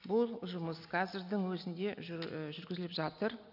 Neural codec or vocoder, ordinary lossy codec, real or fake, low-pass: none; none; real; 5.4 kHz